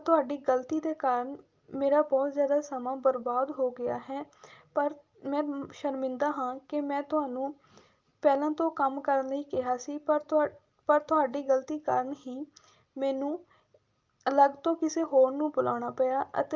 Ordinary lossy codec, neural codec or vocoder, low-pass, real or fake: Opus, 24 kbps; none; 7.2 kHz; real